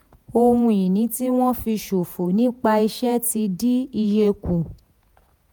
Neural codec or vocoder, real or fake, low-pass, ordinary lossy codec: vocoder, 48 kHz, 128 mel bands, Vocos; fake; none; none